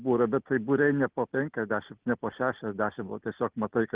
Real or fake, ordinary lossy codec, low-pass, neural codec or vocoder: real; Opus, 16 kbps; 3.6 kHz; none